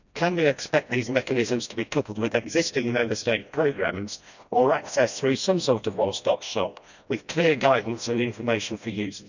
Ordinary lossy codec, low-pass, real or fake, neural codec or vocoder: none; 7.2 kHz; fake; codec, 16 kHz, 1 kbps, FreqCodec, smaller model